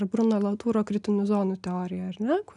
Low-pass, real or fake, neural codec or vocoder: 10.8 kHz; real; none